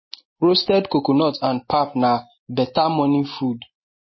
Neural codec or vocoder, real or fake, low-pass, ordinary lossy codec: none; real; 7.2 kHz; MP3, 24 kbps